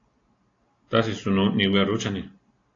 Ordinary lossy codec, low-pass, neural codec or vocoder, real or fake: AAC, 32 kbps; 7.2 kHz; none; real